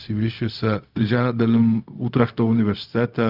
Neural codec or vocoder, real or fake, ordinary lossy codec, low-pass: codec, 16 kHz, 0.4 kbps, LongCat-Audio-Codec; fake; Opus, 24 kbps; 5.4 kHz